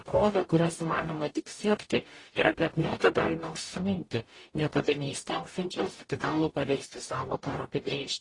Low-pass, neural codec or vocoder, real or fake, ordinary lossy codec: 10.8 kHz; codec, 44.1 kHz, 0.9 kbps, DAC; fake; AAC, 32 kbps